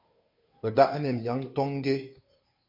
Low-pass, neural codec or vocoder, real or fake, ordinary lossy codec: 5.4 kHz; codec, 16 kHz, 2 kbps, FunCodec, trained on Chinese and English, 25 frames a second; fake; MP3, 32 kbps